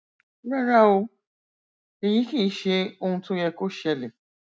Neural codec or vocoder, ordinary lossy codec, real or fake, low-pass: none; none; real; none